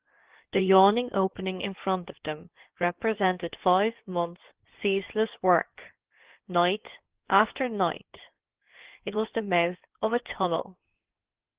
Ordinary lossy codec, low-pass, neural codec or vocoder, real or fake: Opus, 16 kbps; 3.6 kHz; codec, 16 kHz in and 24 kHz out, 2.2 kbps, FireRedTTS-2 codec; fake